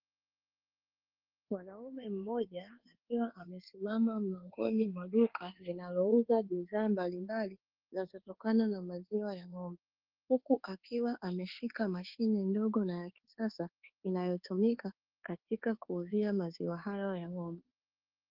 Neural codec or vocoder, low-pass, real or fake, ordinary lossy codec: codec, 24 kHz, 1.2 kbps, DualCodec; 5.4 kHz; fake; Opus, 16 kbps